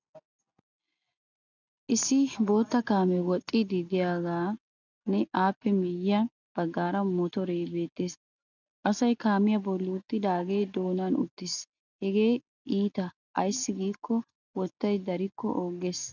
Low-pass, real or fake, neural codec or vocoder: 7.2 kHz; real; none